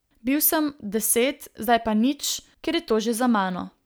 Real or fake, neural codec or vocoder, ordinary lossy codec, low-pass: real; none; none; none